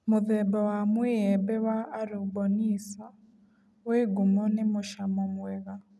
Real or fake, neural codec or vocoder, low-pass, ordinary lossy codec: real; none; none; none